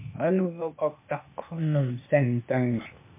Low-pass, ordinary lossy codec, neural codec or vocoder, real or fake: 3.6 kHz; MP3, 32 kbps; codec, 16 kHz, 0.8 kbps, ZipCodec; fake